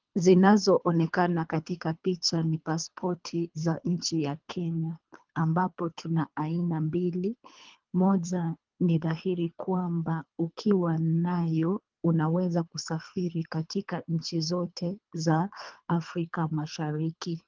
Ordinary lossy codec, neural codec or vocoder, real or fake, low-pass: Opus, 32 kbps; codec, 24 kHz, 3 kbps, HILCodec; fake; 7.2 kHz